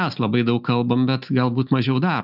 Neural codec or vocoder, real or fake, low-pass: none; real; 5.4 kHz